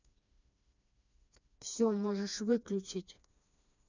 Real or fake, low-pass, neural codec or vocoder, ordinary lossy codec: fake; 7.2 kHz; codec, 16 kHz, 2 kbps, FreqCodec, smaller model; none